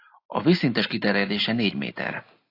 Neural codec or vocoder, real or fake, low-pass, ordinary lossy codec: none; real; 5.4 kHz; AAC, 32 kbps